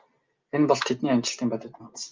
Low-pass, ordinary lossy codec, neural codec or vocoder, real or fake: 7.2 kHz; Opus, 24 kbps; none; real